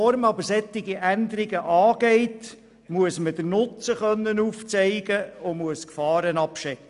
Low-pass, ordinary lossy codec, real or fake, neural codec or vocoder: 10.8 kHz; MP3, 96 kbps; real; none